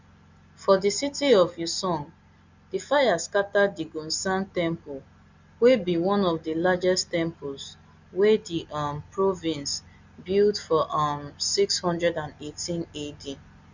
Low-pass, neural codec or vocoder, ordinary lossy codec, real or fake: 7.2 kHz; none; Opus, 64 kbps; real